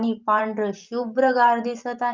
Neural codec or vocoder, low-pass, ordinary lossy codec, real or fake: vocoder, 44.1 kHz, 128 mel bands every 512 samples, BigVGAN v2; 7.2 kHz; Opus, 32 kbps; fake